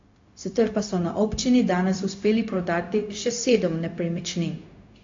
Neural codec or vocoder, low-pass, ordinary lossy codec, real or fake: codec, 16 kHz, 0.4 kbps, LongCat-Audio-Codec; 7.2 kHz; AAC, 48 kbps; fake